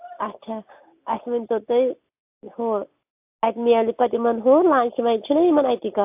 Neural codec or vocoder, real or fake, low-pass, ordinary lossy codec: none; real; 3.6 kHz; AAC, 32 kbps